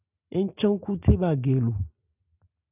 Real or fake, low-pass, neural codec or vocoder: real; 3.6 kHz; none